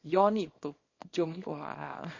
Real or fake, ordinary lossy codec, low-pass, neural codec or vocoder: fake; MP3, 32 kbps; 7.2 kHz; codec, 24 kHz, 0.9 kbps, WavTokenizer, small release